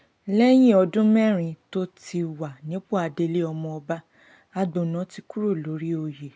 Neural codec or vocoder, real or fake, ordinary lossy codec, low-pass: none; real; none; none